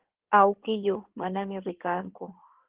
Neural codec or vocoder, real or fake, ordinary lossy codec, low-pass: codec, 16 kHz in and 24 kHz out, 2.2 kbps, FireRedTTS-2 codec; fake; Opus, 16 kbps; 3.6 kHz